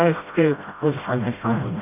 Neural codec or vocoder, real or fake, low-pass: codec, 16 kHz, 0.5 kbps, FreqCodec, smaller model; fake; 3.6 kHz